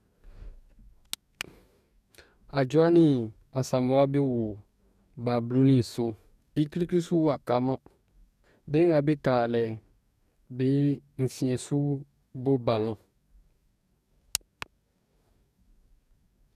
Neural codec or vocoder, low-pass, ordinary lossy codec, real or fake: codec, 44.1 kHz, 2.6 kbps, DAC; 14.4 kHz; none; fake